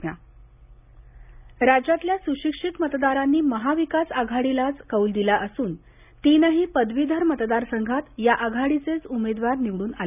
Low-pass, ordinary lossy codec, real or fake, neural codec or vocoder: 3.6 kHz; none; real; none